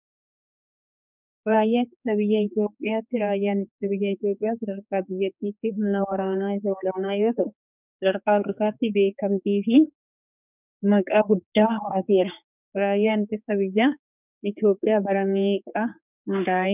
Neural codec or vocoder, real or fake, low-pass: codec, 16 kHz, 4 kbps, X-Codec, HuBERT features, trained on general audio; fake; 3.6 kHz